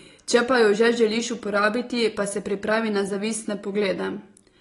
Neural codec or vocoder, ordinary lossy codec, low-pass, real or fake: none; AAC, 32 kbps; 10.8 kHz; real